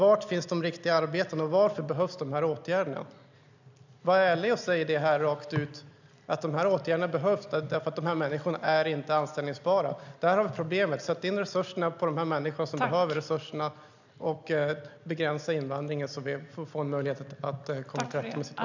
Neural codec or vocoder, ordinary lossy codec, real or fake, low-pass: none; none; real; 7.2 kHz